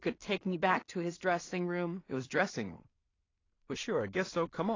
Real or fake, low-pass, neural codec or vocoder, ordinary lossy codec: fake; 7.2 kHz; codec, 16 kHz in and 24 kHz out, 0.4 kbps, LongCat-Audio-Codec, two codebook decoder; AAC, 32 kbps